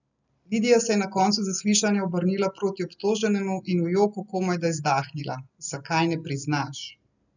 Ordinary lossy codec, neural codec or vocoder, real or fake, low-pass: none; none; real; 7.2 kHz